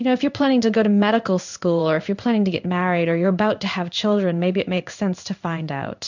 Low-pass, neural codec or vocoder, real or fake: 7.2 kHz; codec, 16 kHz in and 24 kHz out, 1 kbps, XY-Tokenizer; fake